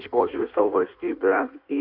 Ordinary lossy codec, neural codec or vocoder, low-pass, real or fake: MP3, 48 kbps; codec, 16 kHz in and 24 kHz out, 1.1 kbps, FireRedTTS-2 codec; 5.4 kHz; fake